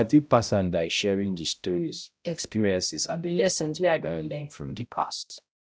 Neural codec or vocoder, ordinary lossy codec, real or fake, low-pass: codec, 16 kHz, 0.5 kbps, X-Codec, HuBERT features, trained on balanced general audio; none; fake; none